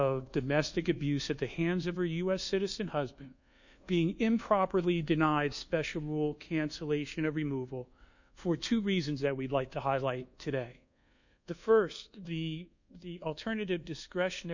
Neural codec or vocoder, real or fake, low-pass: codec, 24 kHz, 1.2 kbps, DualCodec; fake; 7.2 kHz